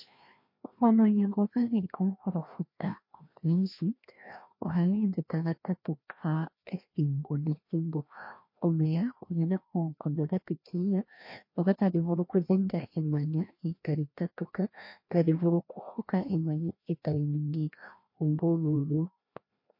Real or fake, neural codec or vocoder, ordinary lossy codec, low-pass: fake; codec, 16 kHz, 1 kbps, FreqCodec, larger model; MP3, 32 kbps; 5.4 kHz